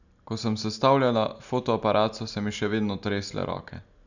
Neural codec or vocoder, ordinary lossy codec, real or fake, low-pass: none; none; real; 7.2 kHz